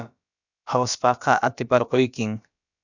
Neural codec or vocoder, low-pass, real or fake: codec, 16 kHz, about 1 kbps, DyCAST, with the encoder's durations; 7.2 kHz; fake